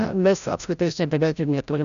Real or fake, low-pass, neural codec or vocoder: fake; 7.2 kHz; codec, 16 kHz, 0.5 kbps, FreqCodec, larger model